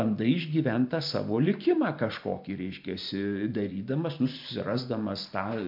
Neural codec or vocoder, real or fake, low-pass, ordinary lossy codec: vocoder, 44.1 kHz, 128 mel bands every 512 samples, BigVGAN v2; fake; 5.4 kHz; MP3, 48 kbps